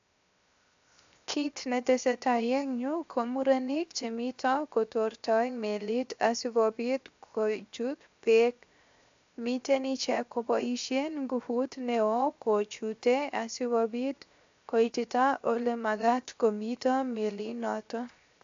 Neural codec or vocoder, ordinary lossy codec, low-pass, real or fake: codec, 16 kHz, 0.7 kbps, FocalCodec; AAC, 64 kbps; 7.2 kHz; fake